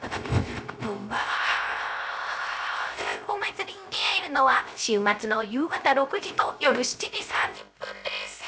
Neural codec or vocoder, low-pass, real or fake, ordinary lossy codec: codec, 16 kHz, 0.3 kbps, FocalCodec; none; fake; none